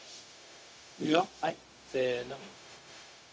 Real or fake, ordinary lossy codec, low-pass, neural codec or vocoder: fake; none; none; codec, 16 kHz, 0.4 kbps, LongCat-Audio-Codec